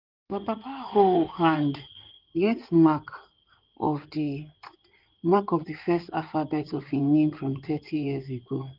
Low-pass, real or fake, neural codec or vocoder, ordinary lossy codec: 5.4 kHz; fake; codec, 16 kHz in and 24 kHz out, 2.2 kbps, FireRedTTS-2 codec; Opus, 32 kbps